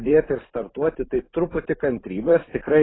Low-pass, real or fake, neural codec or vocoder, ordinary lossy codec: 7.2 kHz; fake; vocoder, 44.1 kHz, 128 mel bands every 512 samples, BigVGAN v2; AAC, 16 kbps